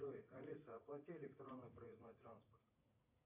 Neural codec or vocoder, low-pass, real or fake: vocoder, 44.1 kHz, 80 mel bands, Vocos; 3.6 kHz; fake